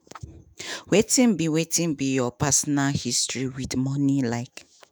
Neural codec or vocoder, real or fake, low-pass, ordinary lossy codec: autoencoder, 48 kHz, 128 numbers a frame, DAC-VAE, trained on Japanese speech; fake; none; none